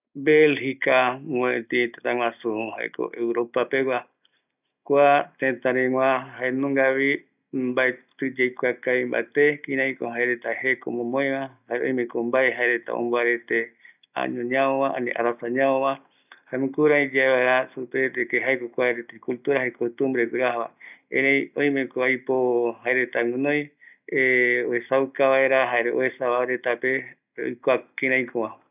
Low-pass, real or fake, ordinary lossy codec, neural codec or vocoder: 3.6 kHz; real; none; none